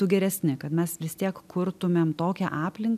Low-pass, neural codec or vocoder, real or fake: 14.4 kHz; none; real